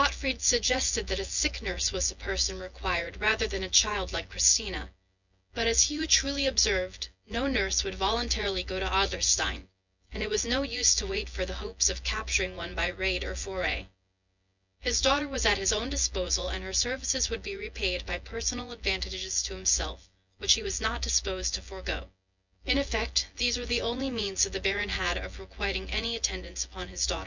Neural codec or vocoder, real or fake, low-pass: vocoder, 24 kHz, 100 mel bands, Vocos; fake; 7.2 kHz